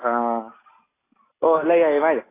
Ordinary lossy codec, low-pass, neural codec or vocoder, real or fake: AAC, 16 kbps; 3.6 kHz; none; real